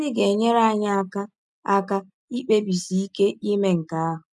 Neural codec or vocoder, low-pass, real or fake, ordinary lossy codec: none; none; real; none